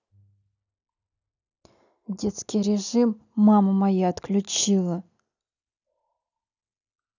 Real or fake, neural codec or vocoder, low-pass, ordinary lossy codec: real; none; 7.2 kHz; none